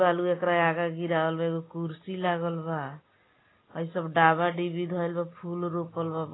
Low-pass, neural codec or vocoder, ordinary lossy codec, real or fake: 7.2 kHz; none; AAC, 16 kbps; real